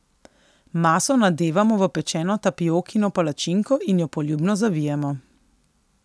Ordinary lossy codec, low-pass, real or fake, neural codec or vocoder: none; none; real; none